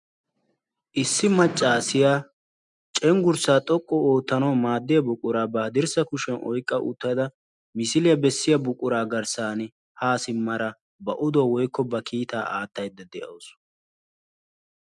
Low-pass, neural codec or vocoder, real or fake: 10.8 kHz; none; real